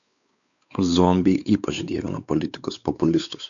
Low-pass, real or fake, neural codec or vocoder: 7.2 kHz; fake; codec, 16 kHz, 4 kbps, X-Codec, WavLM features, trained on Multilingual LibriSpeech